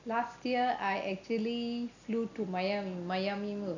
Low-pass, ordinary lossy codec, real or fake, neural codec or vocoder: 7.2 kHz; none; real; none